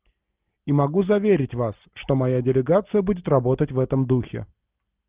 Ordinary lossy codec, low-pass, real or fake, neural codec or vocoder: Opus, 32 kbps; 3.6 kHz; real; none